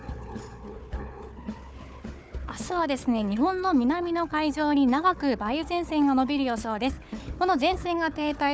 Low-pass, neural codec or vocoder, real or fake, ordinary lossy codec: none; codec, 16 kHz, 4 kbps, FunCodec, trained on Chinese and English, 50 frames a second; fake; none